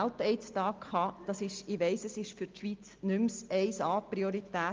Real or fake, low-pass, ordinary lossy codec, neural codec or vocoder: real; 7.2 kHz; Opus, 32 kbps; none